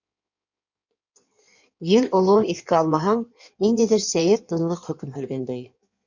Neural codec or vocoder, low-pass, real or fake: codec, 16 kHz in and 24 kHz out, 1.1 kbps, FireRedTTS-2 codec; 7.2 kHz; fake